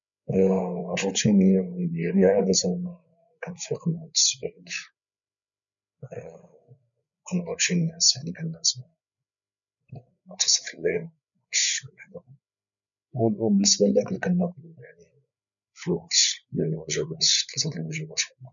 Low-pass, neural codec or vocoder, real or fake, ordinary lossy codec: 7.2 kHz; codec, 16 kHz, 8 kbps, FreqCodec, larger model; fake; none